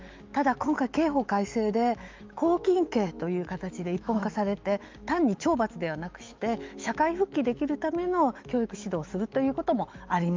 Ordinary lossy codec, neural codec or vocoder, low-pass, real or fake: Opus, 24 kbps; autoencoder, 48 kHz, 128 numbers a frame, DAC-VAE, trained on Japanese speech; 7.2 kHz; fake